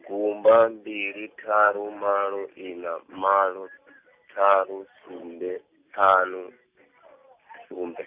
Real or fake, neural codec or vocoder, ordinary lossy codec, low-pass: real; none; Opus, 64 kbps; 3.6 kHz